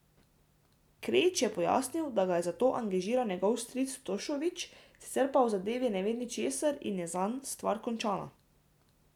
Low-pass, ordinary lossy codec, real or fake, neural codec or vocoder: 19.8 kHz; none; real; none